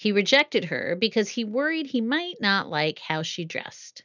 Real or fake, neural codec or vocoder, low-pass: real; none; 7.2 kHz